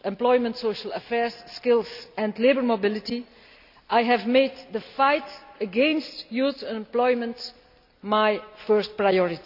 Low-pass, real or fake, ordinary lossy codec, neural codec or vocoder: 5.4 kHz; real; none; none